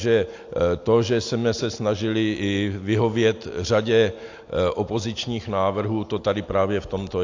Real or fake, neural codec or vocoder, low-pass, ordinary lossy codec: real; none; 7.2 kHz; AAC, 48 kbps